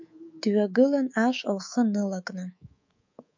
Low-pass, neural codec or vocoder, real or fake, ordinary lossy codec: 7.2 kHz; codec, 24 kHz, 3.1 kbps, DualCodec; fake; MP3, 48 kbps